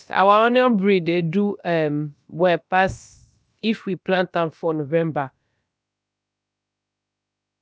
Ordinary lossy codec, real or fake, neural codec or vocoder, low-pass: none; fake; codec, 16 kHz, about 1 kbps, DyCAST, with the encoder's durations; none